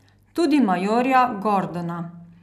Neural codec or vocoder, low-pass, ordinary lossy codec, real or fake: none; 14.4 kHz; none; real